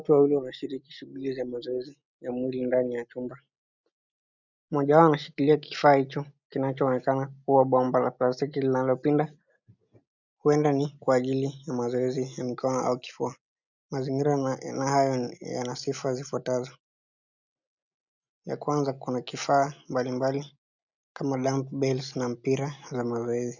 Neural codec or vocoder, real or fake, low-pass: none; real; 7.2 kHz